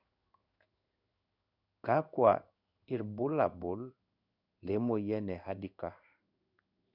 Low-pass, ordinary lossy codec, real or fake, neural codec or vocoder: 5.4 kHz; none; fake; codec, 16 kHz in and 24 kHz out, 1 kbps, XY-Tokenizer